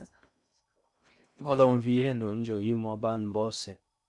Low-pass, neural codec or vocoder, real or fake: 10.8 kHz; codec, 16 kHz in and 24 kHz out, 0.6 kbps, FocalCodec, streaming, 2048 codes; fake